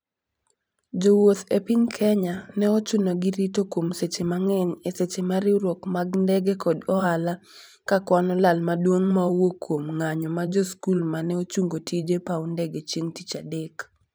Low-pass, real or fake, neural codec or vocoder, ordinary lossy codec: none; fake; vocoder, 44.1 kHz, 128 mel bands every 512 samples, BigVGAN v2; none